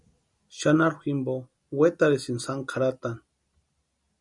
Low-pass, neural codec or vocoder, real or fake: 10.8 kHz; none; real